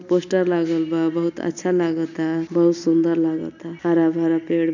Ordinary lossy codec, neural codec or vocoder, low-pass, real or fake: none; none; 7.2 kHz; real